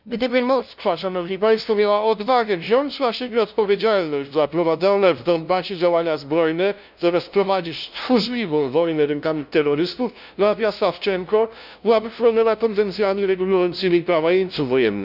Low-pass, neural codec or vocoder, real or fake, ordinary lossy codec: 5.4 kHz; codec, 16 kHz, 0.5 kbps, FunCodec, trained on LibriTTS, 25 frames a second; fake; none